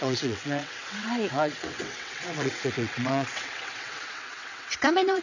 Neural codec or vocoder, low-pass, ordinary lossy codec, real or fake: vocoder, 22.05 kHz, 80 mel bands, WaveNeXt; 7.2 kHz; none; fake